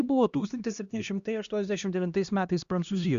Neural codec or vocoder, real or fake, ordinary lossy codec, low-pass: codec, 16 kHz, 1 kbps, X-Codec, HuBERT features, trained on LibriSpeech; fake; Opus, 64 kbps; 7.2 kHz